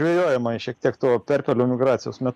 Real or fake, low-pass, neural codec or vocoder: real; 14.4 kHz; none